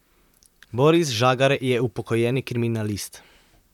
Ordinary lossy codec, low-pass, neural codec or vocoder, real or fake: none; 19.8 kHz; vocoder, 44.1 kHz, 128 mel bands, Pupu-Vocoder; fake